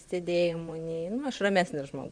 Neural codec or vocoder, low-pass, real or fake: vocoder, 44.1 kHz, 128 mel bands, Pupu-Vocoder; 9.9 kHz; fake